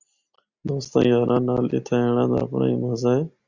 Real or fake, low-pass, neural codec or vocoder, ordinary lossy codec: real; 7.2 kHz; none; Opus, 64 kbps